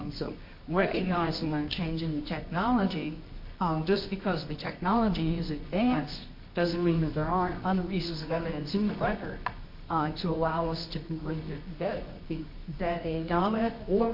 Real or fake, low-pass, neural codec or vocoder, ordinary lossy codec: fake; 5.4 kHz; codec, 24 kHz, 0.9 kbps, WavTokenizer, medium music audio release; MP3, 32 kbps